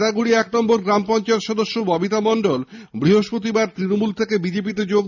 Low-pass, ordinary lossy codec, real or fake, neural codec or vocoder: 7.2 kHz; none; real; none